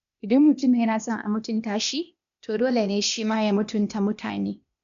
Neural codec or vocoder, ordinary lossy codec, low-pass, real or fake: codec, 16 kHz, 0.8 kbps, ZipCodec; none; 7.2 kHz; fake